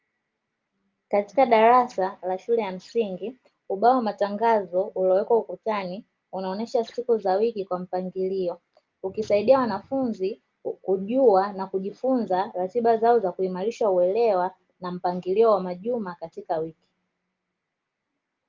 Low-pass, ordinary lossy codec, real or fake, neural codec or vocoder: 7.2 kHz; Opus, 24 kbps; real; none